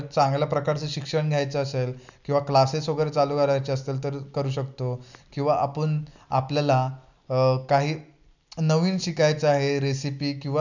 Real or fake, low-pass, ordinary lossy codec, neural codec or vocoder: real; 7.2 kHz; none; none